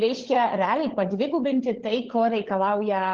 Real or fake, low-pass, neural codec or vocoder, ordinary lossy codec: fake; 7.2 kHz; codec, 16 kHz, 16 kbps, FunCodec, trained on LibriTTS, 50 frames a second; Opus, 16 kbps